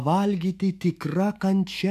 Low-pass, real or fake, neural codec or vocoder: 14.4 kHz; fake; autoencoder, 48 kHz, 128 numbers a frame, DAC-VAE, trained on Japanese speech